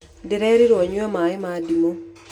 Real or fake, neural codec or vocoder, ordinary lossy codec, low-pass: real; none; none; 19.8 kHz